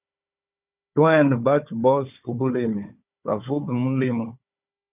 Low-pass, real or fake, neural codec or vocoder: 3.6 kHz; fake; codec, 16 kHz, 4 kbps, FunCodec, trained on Chinese and English, 50 frames a second